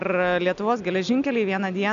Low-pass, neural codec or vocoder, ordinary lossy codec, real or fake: 7.2 kHz; none; AAC, 96 kbps; real